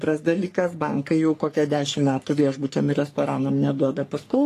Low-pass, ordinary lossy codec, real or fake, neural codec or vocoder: 14.4 kHz; AAC, 48 kbps; fake; codec, 44.1 kHz, 3.4 kbps, Pupu-Codec